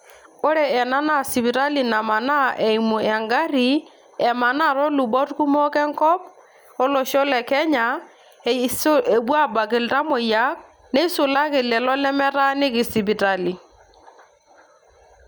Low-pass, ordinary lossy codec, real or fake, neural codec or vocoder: none; none; real; none